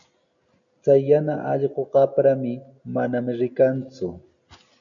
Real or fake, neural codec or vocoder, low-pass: real; none; 7.2 kHz